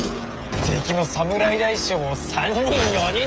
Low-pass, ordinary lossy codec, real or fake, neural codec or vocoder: none; none; fake; codec, 16 kHz, 16 kbps, FreqCodec, smaller model